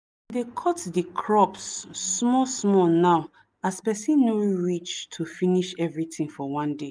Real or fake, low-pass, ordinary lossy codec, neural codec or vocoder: real; none; none; none